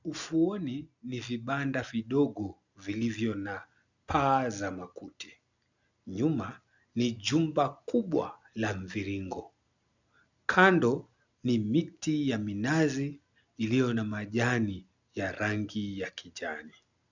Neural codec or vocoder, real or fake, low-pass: none; real; 7.2 kHz